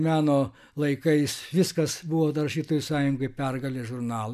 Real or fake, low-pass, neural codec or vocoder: real; 14.4 kHz; none